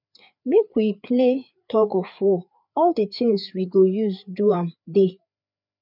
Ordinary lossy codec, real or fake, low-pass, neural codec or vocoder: none; fake; 5.4 kHz; codec, 16 kHz, 4 kbps, FreqCodec, larger model